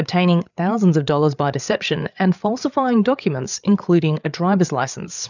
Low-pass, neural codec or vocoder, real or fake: 7.2 kHz; codec, 16 kHz, 8 kbps, FreqCodec, larger model; fake